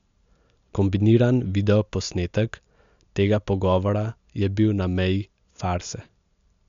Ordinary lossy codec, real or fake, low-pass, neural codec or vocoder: MP3, 64 kbps; real; 7.2 kHz; none